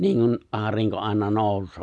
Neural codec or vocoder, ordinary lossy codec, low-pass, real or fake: none; none; none; real